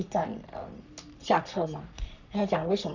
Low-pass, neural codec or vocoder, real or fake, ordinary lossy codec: 7.2 kHz; codec, 44.1 kHz, 3.4 kbps, Pupu-Codec; fake; none